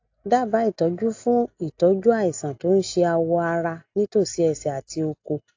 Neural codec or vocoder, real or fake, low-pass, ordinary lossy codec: none; real; 7.2 kHz; AAC, 48 kbps